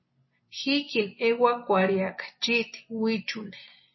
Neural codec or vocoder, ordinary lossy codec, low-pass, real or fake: none; MP3, 24 kbps; 7.2 kHz; real